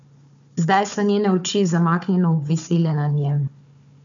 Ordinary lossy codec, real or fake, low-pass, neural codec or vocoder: MP3, 96 kbps; fake; 7.2 kHz; codec, 16 kHz, 4 kbps, FunCodec, trained on Chinese and English, 50 frames a second